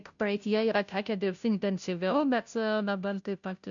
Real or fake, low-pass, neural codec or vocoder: fake; 7.2 kHz; codec, 16 kHz, 0.5 kbps, FunCodec, trained on Chinese and English, 25 frames a second